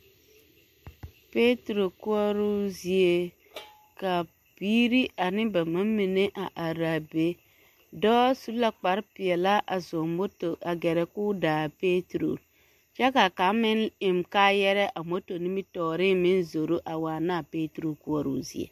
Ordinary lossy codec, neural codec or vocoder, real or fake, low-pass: MP3, 64 kbps; none; real; 14.4 kHz